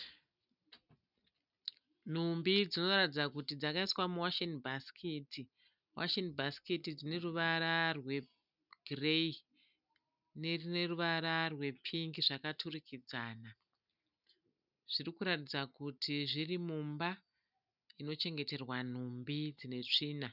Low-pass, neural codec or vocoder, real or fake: 5.4 kHz; none; real